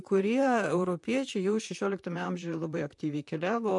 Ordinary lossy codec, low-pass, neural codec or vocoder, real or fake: MP3, 64 kbps; 10.8 kHz; vocoder, 44.1 kHz, 128 mel bands, Pupu-Vocoder; fake